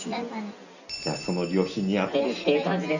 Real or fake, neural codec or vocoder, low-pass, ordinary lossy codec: real; none; 7.2 kHz; none